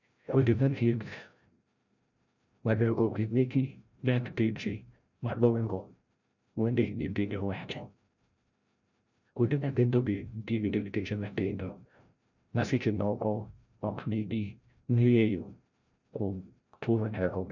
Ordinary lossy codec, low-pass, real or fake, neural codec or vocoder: none; 7.2 kHz; fake; codec, 16 kHz, 0.5 kbps, FreqCodec, larger model